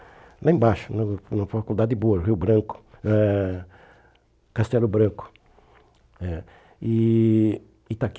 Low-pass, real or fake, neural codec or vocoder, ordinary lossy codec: none; real; none; none